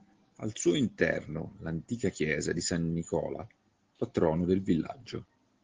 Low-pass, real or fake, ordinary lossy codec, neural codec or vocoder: 7.2 kHz; real; Opus, 16 kbps; none